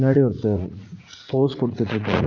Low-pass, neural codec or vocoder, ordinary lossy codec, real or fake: 7.2 kHz; vocoder, 44.1 kHz, 80 mel bands, Vocos; none; fake